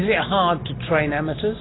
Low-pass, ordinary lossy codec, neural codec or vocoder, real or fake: 7.2 kHz; AAC, 16 kbps; none; real